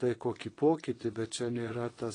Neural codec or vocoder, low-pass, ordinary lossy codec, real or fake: vocoder, 22.05 kHz, 80 mel bands, WaveNeXt; 9.9 kHz; AAC, 32 kbps; fake